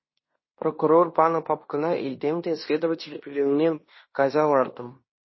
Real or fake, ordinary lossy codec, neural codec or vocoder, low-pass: fake; MP3, 24 kbps; codec, 16 kHz in and 24 kHz out, 0.9 kbps, LongCat-Audio-Codec, fine tuned four codebook decoder; 7.2 kHz